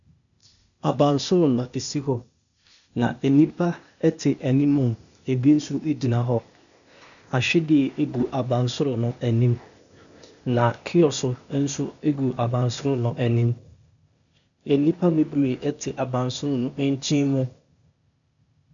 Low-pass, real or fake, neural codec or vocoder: 7.2 kHz; fake; codec, 16 kHz, 0.8 kbps, ZipCodec